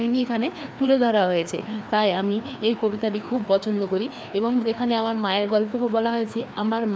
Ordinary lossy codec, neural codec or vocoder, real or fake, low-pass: none; codec, 16 kHz, 2 kbps, FreqCodec, larger model; fake; none